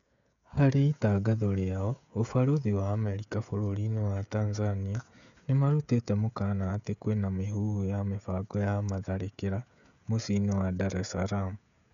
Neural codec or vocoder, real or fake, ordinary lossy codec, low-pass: codec, 16 kHz, 16 kbps, FreqCodec, smaller model; fake; none; 7.2 kHz